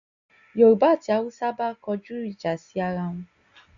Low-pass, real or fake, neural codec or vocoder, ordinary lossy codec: 7.2 kHz; real; none; none